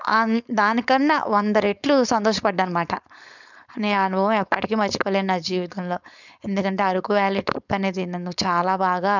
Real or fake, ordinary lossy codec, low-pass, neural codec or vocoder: fake; none; 7.2 kHz; codec, 16 kHz, 4.8 kbps, FACodec